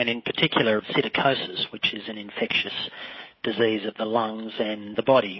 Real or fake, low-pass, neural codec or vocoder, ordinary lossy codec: fake; 7.2 kHz; codec, 16 kHz, 16 kbps, FreqCodec, smaller model; MP3, 24 kbps